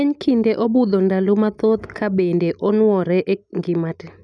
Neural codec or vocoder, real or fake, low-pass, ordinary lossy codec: none; real; 9.9 kHz; none